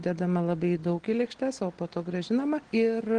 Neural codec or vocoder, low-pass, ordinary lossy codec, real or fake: none; 9.9 kHz; Opus, 16 kbps; real